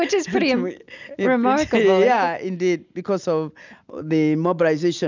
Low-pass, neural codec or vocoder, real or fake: 7.2 kHz; none; real